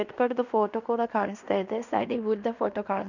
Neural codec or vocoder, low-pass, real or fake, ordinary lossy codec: codec, 16 kHz in and 24 kHz out, 0.9 kbps, LongCat-Audio-Codec, fine tuned four codebook decoder; 7.2 kHz; fake; none